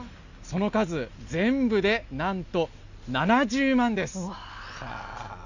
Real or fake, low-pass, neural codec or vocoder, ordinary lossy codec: real; 7.2 kHz; none; none